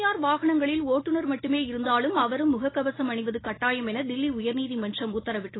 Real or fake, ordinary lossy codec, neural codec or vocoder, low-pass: real; AAC, 16 kbps; none; 7.2 kHz